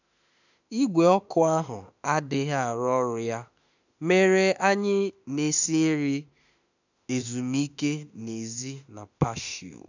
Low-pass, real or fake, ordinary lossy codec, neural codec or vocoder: 7.2 kHz; fake; none; autoencoder, 48 kHz, 32 numbers a frame, DAC-VAE, trained on Japanese speech